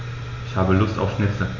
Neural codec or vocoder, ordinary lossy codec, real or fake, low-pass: none; MP3, 48 kbps; real; 7.2 kHz